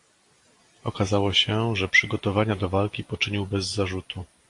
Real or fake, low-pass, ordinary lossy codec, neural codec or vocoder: real; 10.8 kHz; AAC, 48 kbps; none